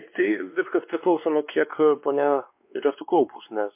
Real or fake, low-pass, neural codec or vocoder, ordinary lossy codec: fake; 3.6 kHz; codec, 16 kHz, 2 kbps, X-Codec, WavLM features, trained on Multilingual LibriSpeech; MP3, 32 kbps